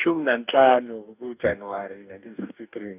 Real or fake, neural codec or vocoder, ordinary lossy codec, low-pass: fake; codec, 44.1 kHz, 2.6 kbps, DAC; none; 3.6 kHz